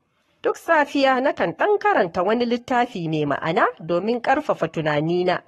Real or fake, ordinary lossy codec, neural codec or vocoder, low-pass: fake; AAC, 32 kbps; codec, 44.1 kHz, 7.8 kbps, Pupu-Codec; 19.8 kHz